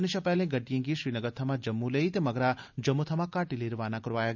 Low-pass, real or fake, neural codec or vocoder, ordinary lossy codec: 7.2 kHz; real; none; none